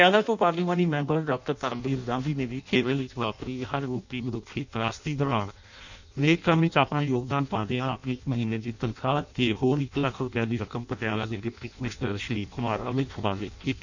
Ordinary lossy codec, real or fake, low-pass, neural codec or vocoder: none; fake; 7.2 kHz; codec, 16 kHz in and 24 kHz out, 0.6 kbps, FireRedTTS-2 codec